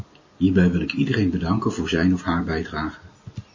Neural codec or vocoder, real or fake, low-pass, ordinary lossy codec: none; real; 7.2 kHz; MP3, 32 kbps